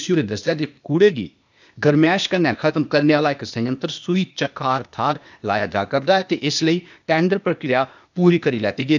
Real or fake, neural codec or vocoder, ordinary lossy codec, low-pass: fake; codec, 16 kHz, 0.8 kbps, ZipCodec; none; 7.2 kHz